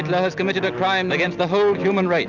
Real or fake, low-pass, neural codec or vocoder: real; 7.2 kHz; none